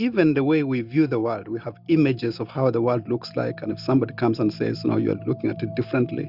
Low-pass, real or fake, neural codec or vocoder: 5.4 kHz; real; none